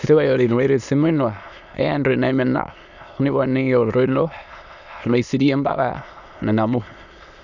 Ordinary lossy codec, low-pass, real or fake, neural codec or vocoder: none; 7.2 kHz; fake; autoencoder, 22.05 kHz, a latent of 192 numbers a frame, VITS, trained on many speakers